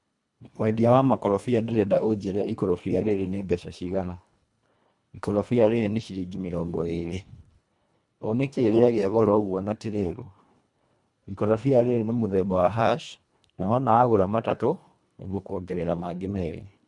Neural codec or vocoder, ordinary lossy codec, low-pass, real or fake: codec, 24 kHz, 1.5 kbps, HILCodec; MP3, 96 kbps; 10.8 kHz; fake